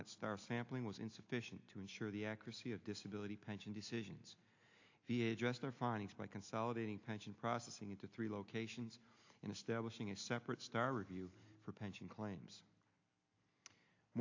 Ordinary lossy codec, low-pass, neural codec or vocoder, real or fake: AAC, 48 kbps; 7.2 kHz; none; real